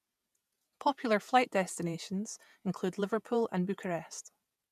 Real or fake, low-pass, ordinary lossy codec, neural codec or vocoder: fake; 14.4 kHz; none; vocoder, 48 kHz, 128 mel bands, Vocos